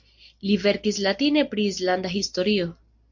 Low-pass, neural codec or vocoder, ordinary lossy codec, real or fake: 7.2 kHz; none; MP3, 48 kbps; real